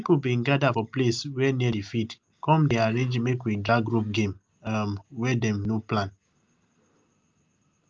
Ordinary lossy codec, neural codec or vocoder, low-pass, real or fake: Opus, 24 kbps; none; 7.2 kHz; real